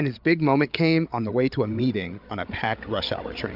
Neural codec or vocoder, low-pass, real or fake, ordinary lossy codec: codec, 16 kHz, 8 kbps, FreqCodec, larger model; 5.4 kHz; fake; AAC, 48 kbps